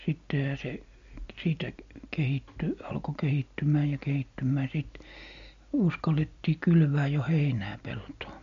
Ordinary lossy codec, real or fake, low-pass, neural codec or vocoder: MP3, 48 kbps; real; 7.2 kHz; none